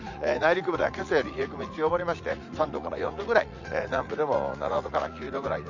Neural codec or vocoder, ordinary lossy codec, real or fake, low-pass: vocoder, 44.1 kHz, 80 mel bands, Vocos; none; fake; 7.2 kHz